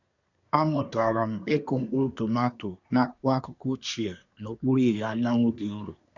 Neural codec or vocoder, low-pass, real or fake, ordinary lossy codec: codec, 24 kHz, 1 kbps, SNAC; 7.2 kHz; fake; none